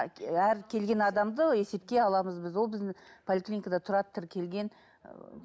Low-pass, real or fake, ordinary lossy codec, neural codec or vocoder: none; real; none; none